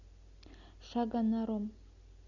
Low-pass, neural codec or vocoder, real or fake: 7.2 kHz; none; real